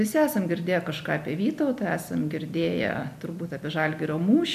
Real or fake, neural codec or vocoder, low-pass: real; none; 14.4 kHz